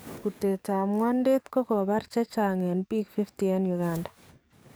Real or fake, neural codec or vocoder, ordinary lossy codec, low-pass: fake; codec, 44.1 kHz, 7.8 kbps, DAC; none; none